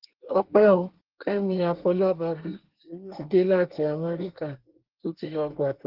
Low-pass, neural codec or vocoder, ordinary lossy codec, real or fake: 5.4 kHz; codec, 24 kHz, 1 kbps, SNAC; Opus, 16 kbps; fake